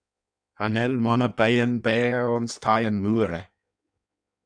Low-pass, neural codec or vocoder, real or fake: 9.9 kHz; codec, 16 kHz in and 24 kHz out, 1.1 kbps, FireRedTTS-2 codec; fake